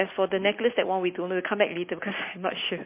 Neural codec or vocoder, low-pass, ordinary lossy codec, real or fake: none; 3.6 kHz; MP3, 24 kbps; real